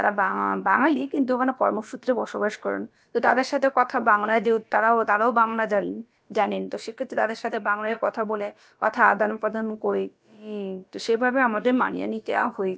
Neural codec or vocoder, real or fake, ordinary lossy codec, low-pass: codec, 16 kHz, about 1 kbps, DyCAST, with the encoder's durations; fake; none; none